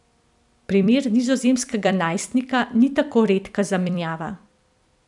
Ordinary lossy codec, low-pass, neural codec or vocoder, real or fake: none; 10.8 kHz; vocoder, 44.1 kHz, 128 mel bands every 256 samples, BigVGAN v2; fake